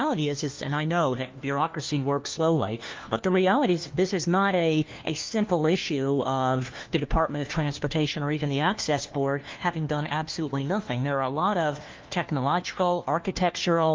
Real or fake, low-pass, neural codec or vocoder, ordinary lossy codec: fake; 7.2 kHz; codec, 16 kHz, 1 kbps, FunCodec, trained on LibriTTS, 50 frames a second; Opus, 32 kbps